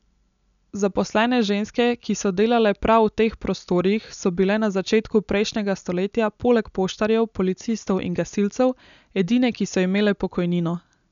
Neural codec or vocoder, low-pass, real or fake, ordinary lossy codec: none; 7.2 kHz; real; none